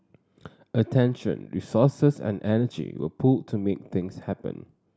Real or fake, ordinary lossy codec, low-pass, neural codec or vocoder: real; none; none; none